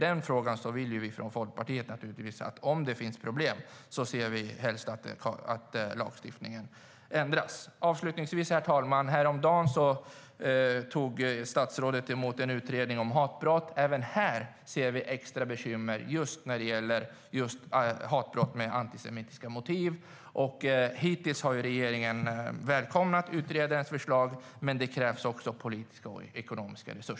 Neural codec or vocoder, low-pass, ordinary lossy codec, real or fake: none; none; none; real